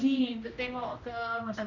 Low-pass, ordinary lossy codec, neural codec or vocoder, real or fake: 7.2 kHz; none; codec, 16 kHz, 1 kbps, X-Codec, HuBERT features, trained on general audio; fake